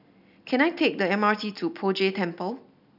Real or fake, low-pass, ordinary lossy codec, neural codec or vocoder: real; 5.4 kHz; none; none